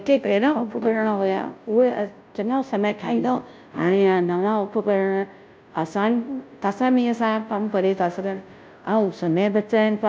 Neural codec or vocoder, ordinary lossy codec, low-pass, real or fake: codec, 16 kHz, 0.5 kbps, FunCodec, trained on Chinese and English, 25 frames a second; none; none; fake